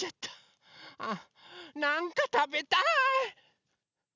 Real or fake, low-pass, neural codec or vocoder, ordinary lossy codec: real; 7.2 kHz; none; none